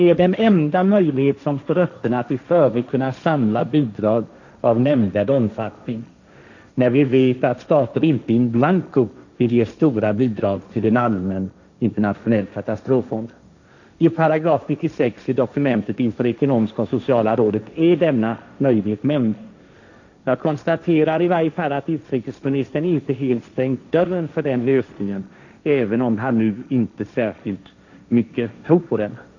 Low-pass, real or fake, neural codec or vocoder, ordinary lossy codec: 7.2 kHz; fake; codec, 16 kHz, 1.1 kbps, Voila-Tokenizer; none